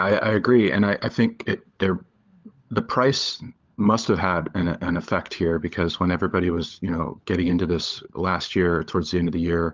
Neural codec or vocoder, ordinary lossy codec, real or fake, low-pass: codec, 16 kHz, 16 kbps, FunCodec, trained on LibriTTS, 50 frames a second; Opus, 32 kbps; fake; 7.2 kHz